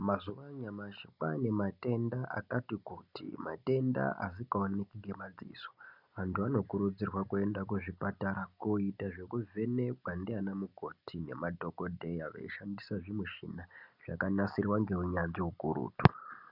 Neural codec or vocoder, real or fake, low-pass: none; real; 5.4 kHz